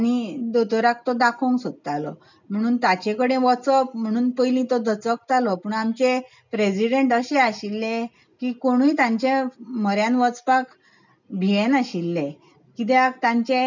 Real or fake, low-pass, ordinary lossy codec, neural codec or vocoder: real; 7.2 kHz; none; none